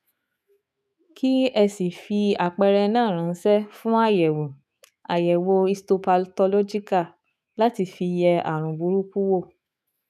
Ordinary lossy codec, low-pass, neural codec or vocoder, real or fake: none; 14.4 kHz; autoencoder, 48 kHz, 128 numbers a frame, DAC-VAE, trained on Japanese speech; fake